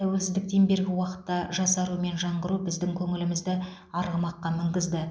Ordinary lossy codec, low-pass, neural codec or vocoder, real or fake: none; none; none; real